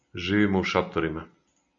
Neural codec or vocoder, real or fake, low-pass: none; real; 7.2 kHz